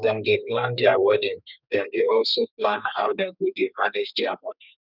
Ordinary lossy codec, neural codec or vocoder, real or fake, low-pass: none; codec, 32 kHz, 1.9 kbps, SNAC; fake; 5.4 kHz